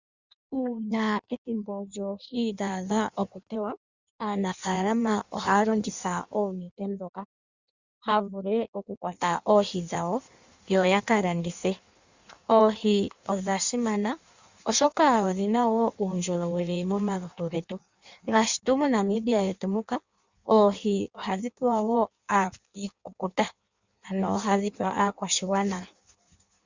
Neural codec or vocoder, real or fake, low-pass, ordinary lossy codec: codec, 16 kHz in and 24 kHz out, 1.1 kbps, FireRedTTS-2 codec; fake; 7.2 kHz; Opus, 64 kbps